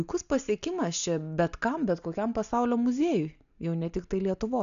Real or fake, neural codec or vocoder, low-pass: real; none; 7.2 kHz